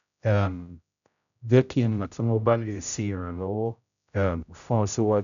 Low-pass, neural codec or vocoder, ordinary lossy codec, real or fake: 7.2 kHz; codec, 16 kHz, 0.5 kbps, X-Codec, HuBERT features, trained on general audio; none; fake